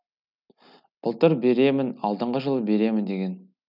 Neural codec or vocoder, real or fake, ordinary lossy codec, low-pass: none; real; none; 5.4 kHz